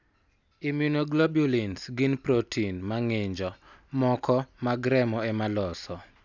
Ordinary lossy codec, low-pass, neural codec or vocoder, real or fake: none; 7.2 kHz; none; real